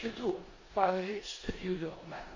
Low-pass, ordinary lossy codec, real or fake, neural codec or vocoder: 7.2 kHz; MP3, 32 kbps; fake; codec, 16 kHz in and 24 kHz out, 0.9 kbps, LongCat-Audio-Codec, four codebook decoder